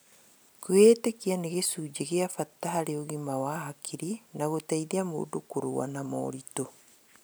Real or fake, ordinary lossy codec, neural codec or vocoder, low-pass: real; none; none; none